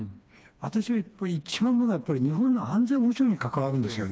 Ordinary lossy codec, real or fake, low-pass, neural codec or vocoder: none; fake; none; codec, 16 kHz, 2 kbps, FreqCodec, smaller model